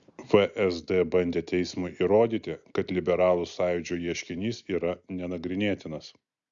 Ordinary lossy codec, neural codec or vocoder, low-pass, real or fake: Opus, 64 kbps; none; 7.2 kHz; real